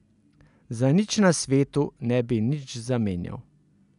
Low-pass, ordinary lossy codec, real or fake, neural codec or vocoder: 10.8 kHz; none; real; none